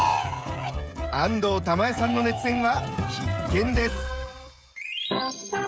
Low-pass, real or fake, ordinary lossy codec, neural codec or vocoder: none; fake; none; codec, 16 kHz, 16 kbps, FreqCodec, smaller model